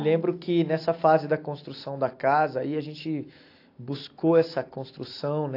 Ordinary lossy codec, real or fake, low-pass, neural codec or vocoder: AAC, 32 kbps; real; 5.4 kHz; none